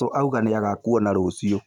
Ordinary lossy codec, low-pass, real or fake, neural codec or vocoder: none; 19.8 kHz; real; none